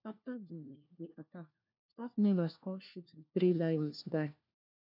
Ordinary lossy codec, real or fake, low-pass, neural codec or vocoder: none; fake; 5.4 kHz; codec, 16 kHz, 1 kbps, FunCodec, trained on LibriTTS, 50 frames a second